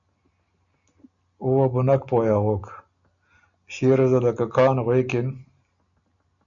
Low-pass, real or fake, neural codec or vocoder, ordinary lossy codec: 7.2 kHz; real; none; MP3, 96 kbps